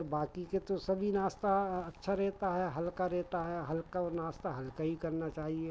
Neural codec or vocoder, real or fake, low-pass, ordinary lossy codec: none; real; none; none